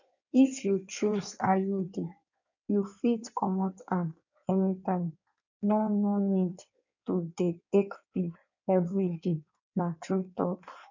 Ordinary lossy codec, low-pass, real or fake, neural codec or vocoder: none; 7.2 kHz; fake; codec, 16 kHz in and 24 kHz out, 1.1 kbps, FireRedTTS-2 codec